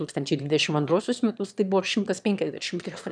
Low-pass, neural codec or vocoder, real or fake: 9.9 kHz; autoencoder, 22.05 kHz, a latent of 192 numbers a frame, VITS, trained on one speaker; fake